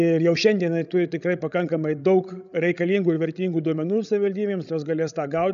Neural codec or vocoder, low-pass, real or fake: codec, 16 kHz, 16 kbps, FreqCodec, larger model; 7.2 kHz; fake